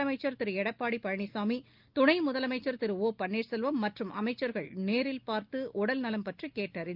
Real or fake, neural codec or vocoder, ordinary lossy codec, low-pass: real; none; Opus, 32 kbps; 5.4 kHz